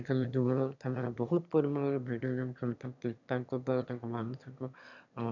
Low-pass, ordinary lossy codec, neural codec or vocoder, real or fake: 7.2 kHz; none; autoencoder, 22.05 kHz, a latent of 192 numbers a frame, VITS, trained on one speaker; fake